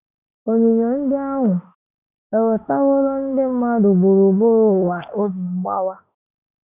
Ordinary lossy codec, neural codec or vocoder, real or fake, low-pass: none; autoencoder, 48 kHz, 32 numbers a frame, DAC-VAE, trained on Japanese speech; fake; 3.6 kHz